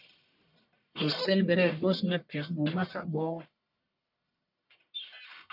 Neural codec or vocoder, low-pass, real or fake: codec, 44.1 kHz, 1.7 kbps, Pupu-Codec; 5.4 kHz; fake